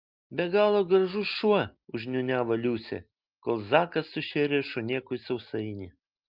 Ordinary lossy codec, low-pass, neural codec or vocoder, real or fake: Opus, 24 kbps; 5.4 kHz; none; real